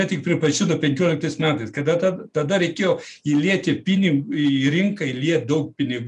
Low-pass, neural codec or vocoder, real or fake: 10.8 kHz; none; real